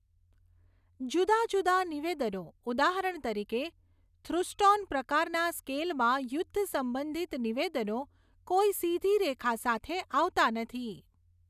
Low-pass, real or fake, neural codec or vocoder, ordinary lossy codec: 14.4 kHz; real; none; none